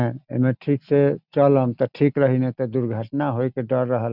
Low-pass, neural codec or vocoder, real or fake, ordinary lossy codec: 5.4 kHz; none; real; none